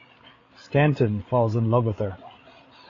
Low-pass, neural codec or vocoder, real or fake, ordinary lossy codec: 7.2 kHz; codec, 16 kHz, 8 kbps, FreqCodec, larger model; fake; AAC, 32 kbps